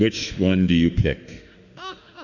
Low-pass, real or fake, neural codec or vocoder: 7.2 kHz; fake; autoencoder, 48 kHz, 32 numbers a frame, DAC-VAE, trained on Japanese speech